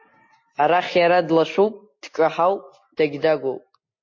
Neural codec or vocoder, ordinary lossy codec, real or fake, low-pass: none; MP3, 32 kbps; real; 7.2 kHz